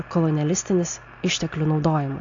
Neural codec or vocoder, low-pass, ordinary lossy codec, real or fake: none; 7.2 kHz; AAC, 64 kbps; real